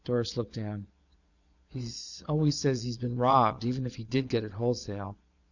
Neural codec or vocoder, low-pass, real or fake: vocoder, 22.05 kHz, 80 mel bands, Vocos; 7.2 kHz; fake